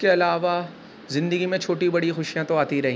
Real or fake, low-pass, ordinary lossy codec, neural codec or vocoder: real; none; none; none